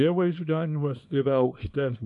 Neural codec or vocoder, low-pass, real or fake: codec, 24 kHz, 0.9 kbps, WavTokenizer, small release; 10.8 kHz; fake